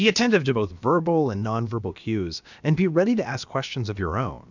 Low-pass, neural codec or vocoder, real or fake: 7.2 kHz; codec, 16 kHz, about 1 kbps, DyCAST, with the encoder's durations; fake